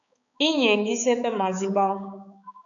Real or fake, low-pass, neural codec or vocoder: fake; 7.2 kHz; codec, 16 kHz, 4 kbps, X-Codec, HuBERT features, trained on balanced general audio